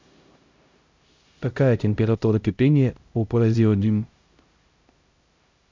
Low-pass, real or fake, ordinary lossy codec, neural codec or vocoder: 7.2 kHz; fake; MP3, 64 kbps; codec, 16 kHz, 0.5 kbps, X-Codec, HuBERT features, trained on LibriSpeech